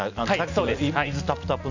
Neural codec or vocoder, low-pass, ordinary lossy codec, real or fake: none; 7.2 kHz; none; real